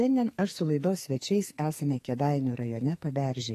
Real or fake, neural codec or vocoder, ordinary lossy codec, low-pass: fake; codec, 44.1 kHz, 2.6 kbps, SNAC; AAC, 48 kbps; 14.4 kHz